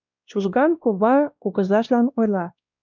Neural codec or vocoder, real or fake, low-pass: codec, 16 kHz, 1 kbps, X-Codec, WavLM features, trained on Multilingual LibriSpeech; fake; 7.2 kHz